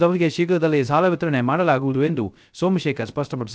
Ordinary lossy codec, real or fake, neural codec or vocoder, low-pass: none; fake; codec, 16 kHz, 0.3 kbps, FocalCodec; none